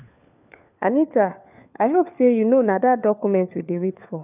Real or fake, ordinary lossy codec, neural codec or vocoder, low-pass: fake; none; codec, 16 kHz, 4 kbps, FunCodec, trained on LibriTTS, 50 frames a second; 3.6 kHz